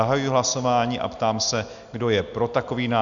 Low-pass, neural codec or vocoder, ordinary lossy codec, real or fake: 7.2 kHz; none; Opus, 64 kbps; real